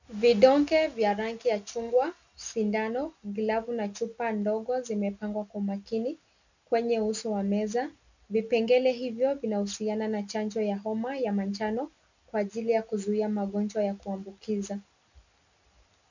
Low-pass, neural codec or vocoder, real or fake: 7.2 kHz; none; real